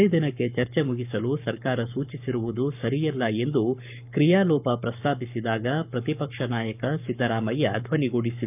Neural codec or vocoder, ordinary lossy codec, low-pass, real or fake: codec, 44.1 kHz, 7.8 kbps, Pupu-Codec; none; 3.6 kHz; fake